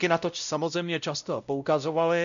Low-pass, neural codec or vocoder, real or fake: 7.2 kHz; codec, 16 kHz, 0.5 kbps, X-Codec, WavLM features, trained on Multilingual LibriSpeech; fake